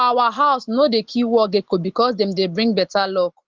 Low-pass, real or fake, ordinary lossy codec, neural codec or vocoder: 7.2 kHz; real; Opus, 16 kbps; none